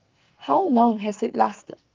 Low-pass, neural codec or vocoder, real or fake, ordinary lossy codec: 7.2 kHz; codec, 44.1 kHz, 2.6 kbps, SNAC; fake; Opus, 24 kbps